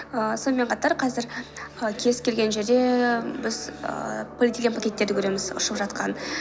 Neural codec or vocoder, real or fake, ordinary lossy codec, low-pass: none; real; none; none